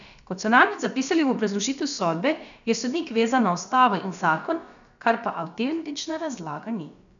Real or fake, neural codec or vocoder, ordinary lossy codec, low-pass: fake; codec, 16 kHz, about 1 kbps, DyCAST, with the encoder's durations; none; 7.2 kHz